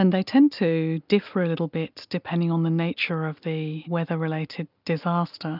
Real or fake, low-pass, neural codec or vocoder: real; 5.4 kHz; none